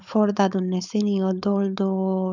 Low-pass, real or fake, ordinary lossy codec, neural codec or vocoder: 7.2 kHz; fake; none; codec, 16 kHz, 4.8 kbps, FACodec